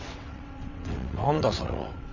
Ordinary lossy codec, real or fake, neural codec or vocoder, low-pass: none; fake; vocoder, 22.05 kHz, 80 mel bands, WaveNeXt; 7.2 kHz